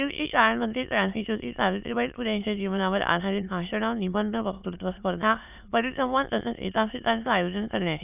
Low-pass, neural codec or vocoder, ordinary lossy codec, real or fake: 3.6 kHz; autoencoder, 22.05 kHz, a latent of 192 numbers a frame, VITS, trained on many speakers; none; fake